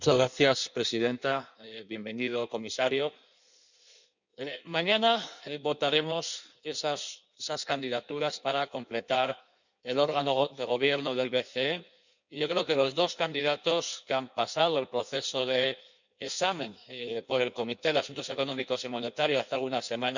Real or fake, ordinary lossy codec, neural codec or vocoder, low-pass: fake; none; codec, 16 kHz in and 24 kHz out, 1.1 kbps, FireRedTTS-2 codec; 7.2 kHz